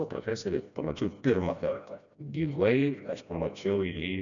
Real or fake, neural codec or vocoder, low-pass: fake; codec, 16 kHz, 1 kbps, FreqCodec, smaller model; 7.2 kHz